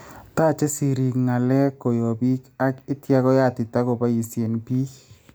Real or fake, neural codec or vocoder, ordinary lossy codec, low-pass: real; none; none; none